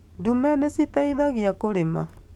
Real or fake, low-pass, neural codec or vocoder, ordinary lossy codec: fake; 19.8 kHz; codec, 44.1 kHz, 7.8 kbps, DAC; MP3, 96 kbps